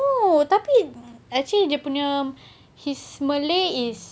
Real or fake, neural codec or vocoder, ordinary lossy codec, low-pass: real; none; none; none